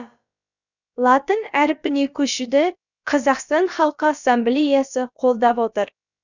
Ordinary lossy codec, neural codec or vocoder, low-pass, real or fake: none; codec, 16 kHz, about 1 kbps, DyCAST, with the encoder's durations; 7.2 kHz; fake